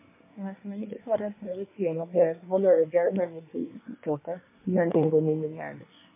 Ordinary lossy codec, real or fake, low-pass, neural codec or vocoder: MP3, 24 kbps; fake; 3.6 kHz; codec, 24 kHz, 1 kbps, SNAC